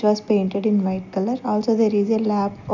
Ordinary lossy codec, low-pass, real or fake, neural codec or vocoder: none; 7.2 kHz; real; none